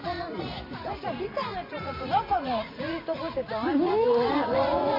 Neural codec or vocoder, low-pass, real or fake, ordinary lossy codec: vocoder, 44.1 kHz, 80 mel bands, Vocos; 5.4 kHz; fake; MP3, 24 kbps